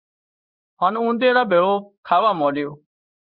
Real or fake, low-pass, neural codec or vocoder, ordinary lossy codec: fake; 5.4 kHz; codec, 16 kHz in and 24 kHz out, 1 kbps, XY-Tokenizer; Opus, 64 kbps